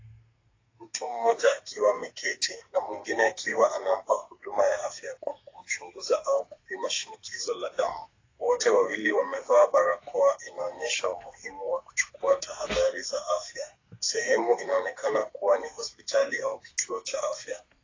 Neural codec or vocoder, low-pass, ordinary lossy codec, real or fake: codec, 32 kHz, 1.9 kbps, SNAC; 7.2 kHz; AAC, 32 kbps; fake